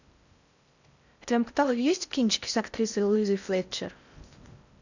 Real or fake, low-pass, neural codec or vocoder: fake; 7.2 kHz; codec, 16 kHz in and 24 kHz out, 0.6 kbps, FocalCodec, streaming, 4096 codes